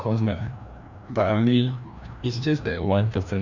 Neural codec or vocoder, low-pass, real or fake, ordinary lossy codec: codec, 16 kHz, 1 kbps, FreqCodec, larger model; 7.2 kHz; fake; none